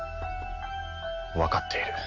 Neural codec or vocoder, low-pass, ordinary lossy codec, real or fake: none; 7.2 kHz; none; real